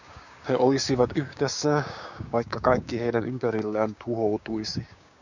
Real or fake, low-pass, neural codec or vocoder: fake; 7.2 kHz; codec, 16 kHz, 6 kbps, DAC